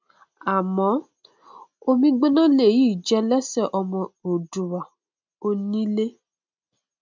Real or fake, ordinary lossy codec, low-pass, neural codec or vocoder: real; MP3, 64 kbps; 7.2 kHz; none